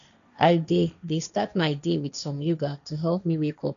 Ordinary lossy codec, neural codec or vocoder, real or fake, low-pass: none; codec, 16 kHz, 1.1 kbps, Voila-Tokenizer; fake; 7.2 kHz